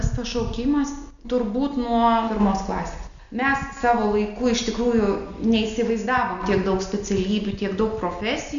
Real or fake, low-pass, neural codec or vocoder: real; 7.2 kHz; none